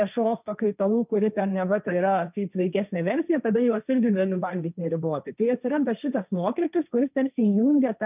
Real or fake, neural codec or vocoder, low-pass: fake; codec, 16 kHz, 1.1 kbps, Voila-Tokenizer; 3.6 kHz